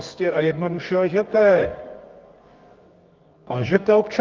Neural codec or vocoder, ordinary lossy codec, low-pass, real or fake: codec, 24 kHz, 0.9 kbps, WavTokenizer, medium music audio release; Opus, 24 kbps; 7.2 kHz; fake